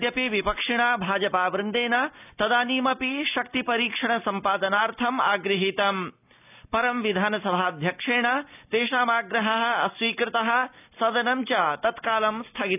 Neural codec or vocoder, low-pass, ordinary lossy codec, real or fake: none; 3.6 kHz; none; real